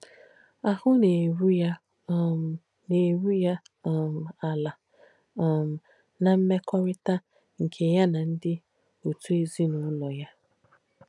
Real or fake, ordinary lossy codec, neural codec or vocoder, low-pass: real; none; none; 10.8 kHz